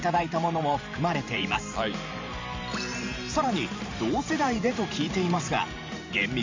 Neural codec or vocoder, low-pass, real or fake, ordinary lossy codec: vocoder, 44.1 kHz, 128 mel bands every 512 samples, BigVGAN v2; 7.2 kHz; fake; MP3, 64 kbps